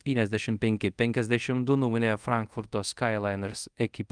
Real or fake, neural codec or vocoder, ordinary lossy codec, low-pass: fake; codec, 24 kHz, 0.5 kbps, DualCodec; Opus, 32 kbps; 9.9 kHz